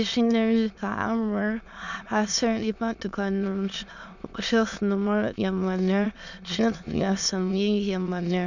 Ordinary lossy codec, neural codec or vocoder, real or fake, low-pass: none; autoencoder, 22.05 kHz, a latent of 192 numbers a frame, VITS, trained on many speakers; fake; 7.2 kHz